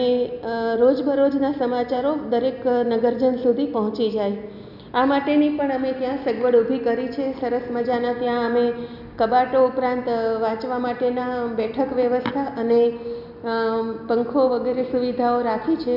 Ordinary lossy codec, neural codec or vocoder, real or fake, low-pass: none; none; real; 5.4 kHz